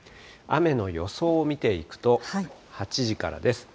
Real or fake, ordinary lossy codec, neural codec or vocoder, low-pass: real; none; none; none